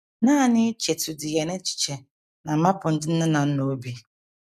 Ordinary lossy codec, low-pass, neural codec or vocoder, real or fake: none; 14.4 kHz; none; real